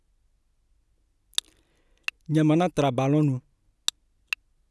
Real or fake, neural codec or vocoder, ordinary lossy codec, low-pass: real; none; none; none